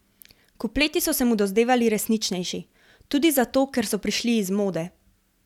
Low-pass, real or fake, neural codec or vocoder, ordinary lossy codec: 19.8 kHz; real; none; none